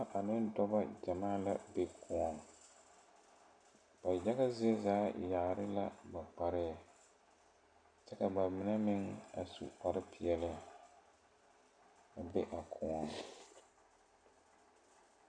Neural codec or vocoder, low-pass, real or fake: none; 9.9 kHz; real